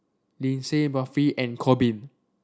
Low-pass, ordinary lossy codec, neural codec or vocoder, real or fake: none; none; none; real